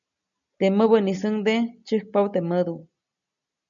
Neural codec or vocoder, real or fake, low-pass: none; real; 7.2 kHz